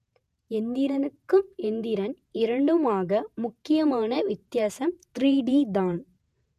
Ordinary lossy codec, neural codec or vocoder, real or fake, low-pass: none; vocoder, 48 kHz, 128 mel bands, Vocos; fake; 14.4 kHz